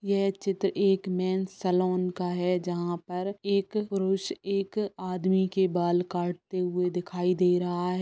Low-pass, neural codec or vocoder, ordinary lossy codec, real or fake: none; none; none; real